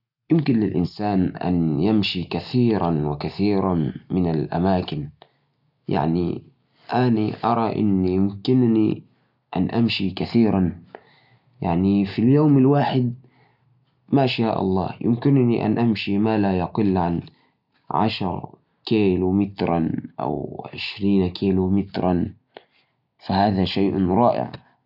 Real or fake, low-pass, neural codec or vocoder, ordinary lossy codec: real; 5.4 kHz; none; none